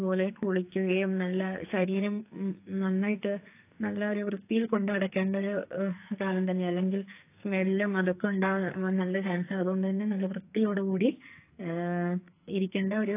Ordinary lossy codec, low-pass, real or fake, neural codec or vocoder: AAC, 24 kbps; 3.6 kHz; fake; codec, 44.1 kHz, 2.6 kbps, SNAC